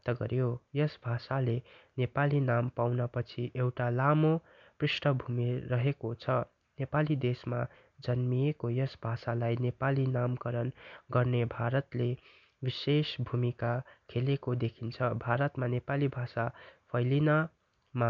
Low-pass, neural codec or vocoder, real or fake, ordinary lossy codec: 7.2 kHz; none; real; none